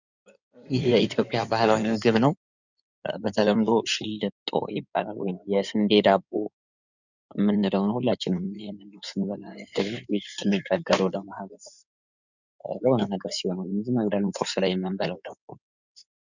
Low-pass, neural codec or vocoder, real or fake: 7.2 kHz; codec, 16 kHz in and 24 kHz out, 2.2 kbps, FireRedTTS-2 codec; fake